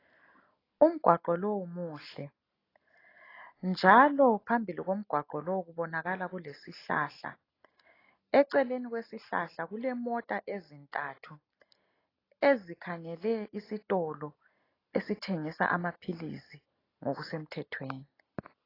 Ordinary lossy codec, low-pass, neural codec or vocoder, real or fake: AAC, 24 kbps; 5.4 kHz; none; real